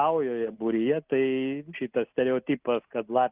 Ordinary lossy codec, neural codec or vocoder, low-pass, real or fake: Opus, 32 kbps; none; 3.6 kHz; real